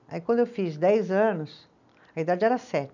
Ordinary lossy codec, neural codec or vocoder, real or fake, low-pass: none; none; real; 7.2 kHz